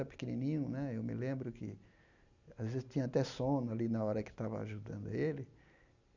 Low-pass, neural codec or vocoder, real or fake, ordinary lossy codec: 7.2 kHz; none; real; none